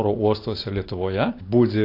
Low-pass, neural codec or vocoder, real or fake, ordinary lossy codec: 5.4 kHz; none; real; AAC, 32 kbps